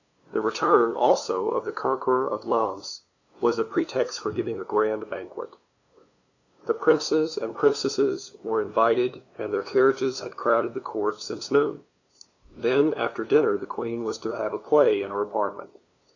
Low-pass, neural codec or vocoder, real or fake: 7.2 kHz; codec, 16 kHz, 2 kbps, FunCodec, trained on LibriTTS, 25 frames a second; fake